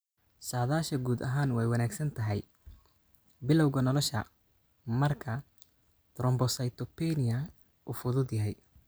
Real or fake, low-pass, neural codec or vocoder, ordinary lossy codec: real; none; none; none